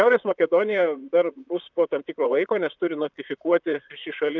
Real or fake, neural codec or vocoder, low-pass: fake; vocoder, 44.1 kHz, 128 mel bands, Pupu-Vocoder; 7.2 kHz